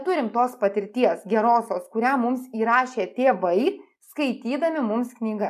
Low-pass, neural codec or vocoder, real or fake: 14.4 kHz; none; real